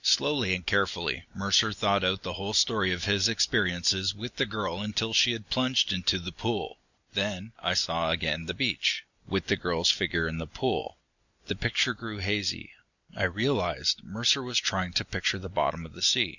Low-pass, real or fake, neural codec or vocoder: 7.2 kHz; real; none